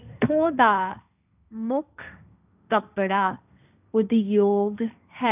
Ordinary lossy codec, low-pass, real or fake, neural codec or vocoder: none; 3.6 kHz; fake; codec, 16 kHz, 1.1 kbps, Voila-Tokenizer